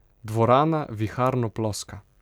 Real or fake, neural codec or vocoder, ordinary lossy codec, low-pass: real; none; none; 19.8 kHz